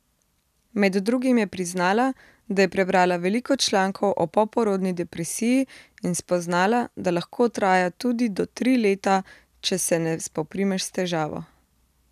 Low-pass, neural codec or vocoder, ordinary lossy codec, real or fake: 14.4 kHz; none; none; real